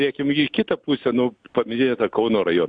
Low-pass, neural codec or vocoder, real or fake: 9.9 kHz; none; real